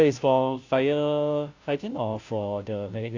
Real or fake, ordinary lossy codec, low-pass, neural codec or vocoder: fake; AAC, 48 kbps; 7.2 kHz; codec, 16 kHz, 0.5 kbps, FunCodec, trained on Chinese and English, 25 frames a second